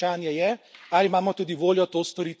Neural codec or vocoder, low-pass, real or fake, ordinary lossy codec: none; none; real; none